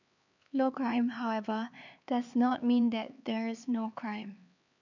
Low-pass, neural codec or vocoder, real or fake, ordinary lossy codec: 7.2 kHz; codec, 16 kHz, 4 kbps, X-Codec, HuBERT features, trained on LibriSpeech; fake; none